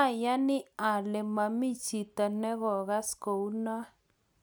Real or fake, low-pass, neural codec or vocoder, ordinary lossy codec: real; none; none; none